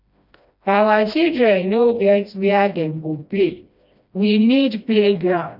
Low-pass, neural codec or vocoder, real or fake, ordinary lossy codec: 5.4 kHz; codec, 16 kHz, 1 kbps, FreqCodec, smaller model; fake; none